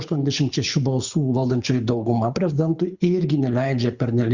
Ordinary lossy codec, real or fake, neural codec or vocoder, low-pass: Opus, 64 kbps; fake; codec, 24 kHz, 6 kbps, HILCodec; 7.2 kHz